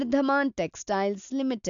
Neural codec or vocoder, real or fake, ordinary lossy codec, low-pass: none; real; AAC, 64 kbps; 7.2 kHz